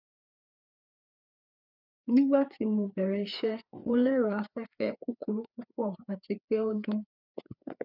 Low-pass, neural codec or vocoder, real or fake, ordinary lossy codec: 5.4 kHz; codec, 16 kHz, 4 kbps, FreqCodec, larger model; fake; none